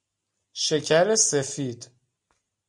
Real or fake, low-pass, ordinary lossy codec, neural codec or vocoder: real; 10.8 kHz; MP3, 64 kbps; none